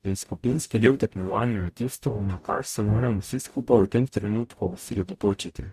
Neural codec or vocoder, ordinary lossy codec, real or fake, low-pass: codec, 44.1 kHz, 0.9 kbps, DAC; Opus, 64 kbps; fake; 14.4 kHz